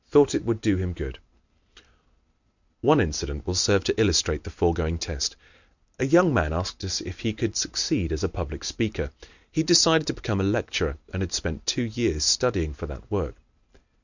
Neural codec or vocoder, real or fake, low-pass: none; real; 7.2 kHz